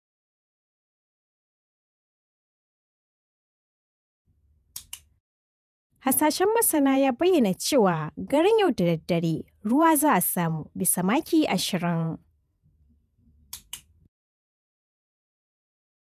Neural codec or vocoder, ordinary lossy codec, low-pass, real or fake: vocoder, 48 kHz, 128 mel bands, Vocos; none; 14.4 kHz; fake